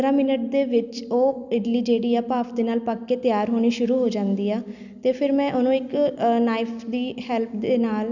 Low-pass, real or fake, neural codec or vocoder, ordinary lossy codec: 7.2 kHz; real; none; none